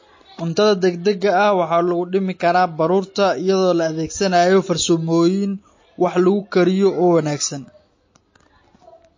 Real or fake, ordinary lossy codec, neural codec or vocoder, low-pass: real; MP3, 32 kbps; none; 7.2 kHz